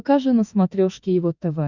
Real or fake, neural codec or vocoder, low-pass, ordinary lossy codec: fake; autoencoder, 48 kHz, 32 numbers a frame, DAC-VAE, trained on Japanese speech; 7.2 kHz; Opus, 64 kbps